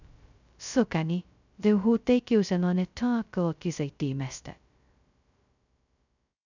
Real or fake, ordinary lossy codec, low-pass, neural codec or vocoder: fake; none; 7.2 kHz; codec, 16 kHz, 0.2 kbps, FocalCodec